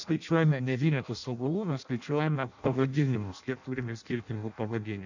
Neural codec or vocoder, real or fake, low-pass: codec, 16 kHz in and 24 kHz out, 0.6 kbps, FireRedTTS-2 codec; fake; 7.2 kHz